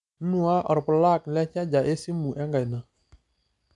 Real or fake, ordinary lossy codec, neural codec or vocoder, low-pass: real; none; none; 10.8 kHz